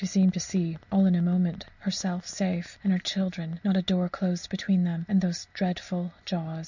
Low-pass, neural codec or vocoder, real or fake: 7.2 kHz; none; real